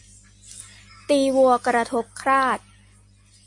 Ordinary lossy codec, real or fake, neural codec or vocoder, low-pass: MP3, 48 kbps; real; none; 10.8 kHz